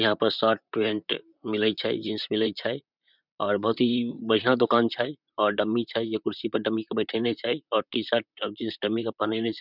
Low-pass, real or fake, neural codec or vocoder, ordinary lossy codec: 5.4 kHz; fake; vocoder, 44.1 kHz, 128 mel bands, Pupu-Vocoder; none